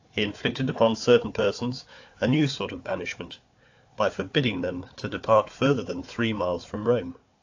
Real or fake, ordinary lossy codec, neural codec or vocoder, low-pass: fake; AAC, 48 kbps; codec, 16 kHz, 4 kbps, FunCodec, trained on Chinese and English, 50 frames a second; 7.2 kHz